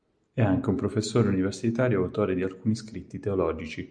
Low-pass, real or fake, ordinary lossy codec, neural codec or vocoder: 9.9 kHz; real; Opus, 64 kbps; none